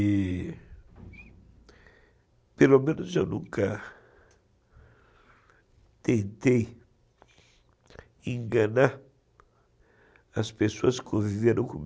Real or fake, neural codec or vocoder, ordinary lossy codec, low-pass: real; none; none; none